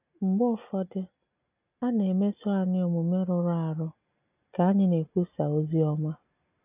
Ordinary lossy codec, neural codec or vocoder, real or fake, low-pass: none; none; real; 3.6 kHz